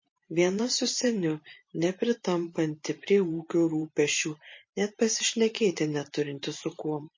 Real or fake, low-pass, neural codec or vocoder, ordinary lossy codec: fake; 7.2 kHz; vocoder, 44.1 kHz, 128 mel bands every 512 samples, BigVGAN v2; MP3, 32 kbps